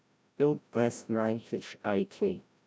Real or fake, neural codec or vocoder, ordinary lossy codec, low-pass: fake; codec, 16 kHz, 0.5 kbps, FreqCodec, larger model; none; none